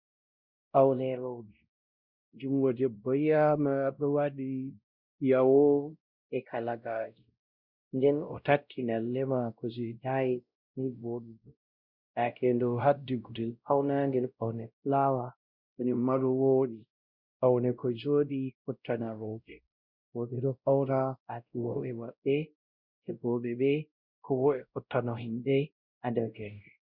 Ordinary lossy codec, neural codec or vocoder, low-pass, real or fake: Opus, 64 kbps; codec, 16 kHz, 0.5 kbps, X-Codec, WavLM features, trained on Multilingual LibriSpeech; 5.4 kHz; fake